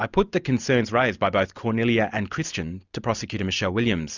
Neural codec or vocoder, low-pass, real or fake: none; 7.2 kHz; real